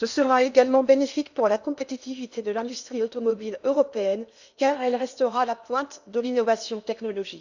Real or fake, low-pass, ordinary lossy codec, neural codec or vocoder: fake; 7.2 kHz; none; codec, 16 kHz in and 24 kHz out, 0.8 kbps, FocalCodec, streaming, 65536 codes